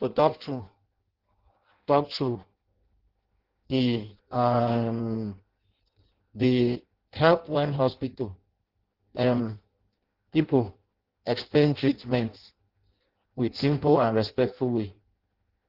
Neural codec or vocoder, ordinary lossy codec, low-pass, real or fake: codec, 16 kHz in and 24 kHz out, 0.6 kbps, FireRedTTS-2 codec; Opus, 16 kbps; 5.4 kHz; fake